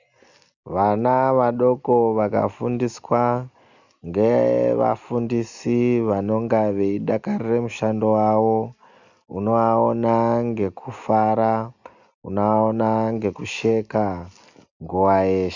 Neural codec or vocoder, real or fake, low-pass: none; real; 7.2 kHz